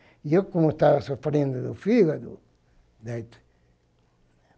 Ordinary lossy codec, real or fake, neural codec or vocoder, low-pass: none; real; none; none